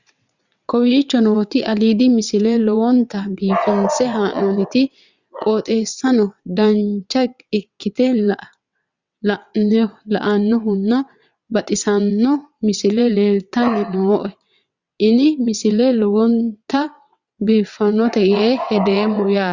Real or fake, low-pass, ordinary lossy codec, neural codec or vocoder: fake; 7.2 kHz; Opus, 64 kbps; vocoder, 22.05 kHz, 80 mel bands, WaveNeXt